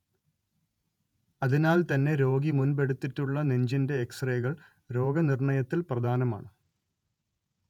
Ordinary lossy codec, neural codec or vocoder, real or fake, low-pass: none; vocoder, 48 kHz, 128 mel bands, Vocos; fake; 19.8 kHz